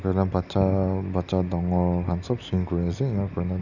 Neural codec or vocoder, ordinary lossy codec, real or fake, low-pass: none; none; real; 7.2 kHz